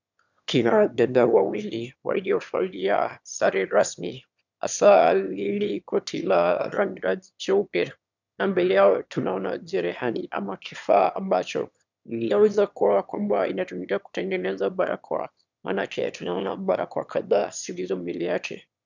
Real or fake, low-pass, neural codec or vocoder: fake; 7.2 kHz; autoencoder, 22.05 kHz, a latent of 192 numbers a frame, VITS, trained on one speaker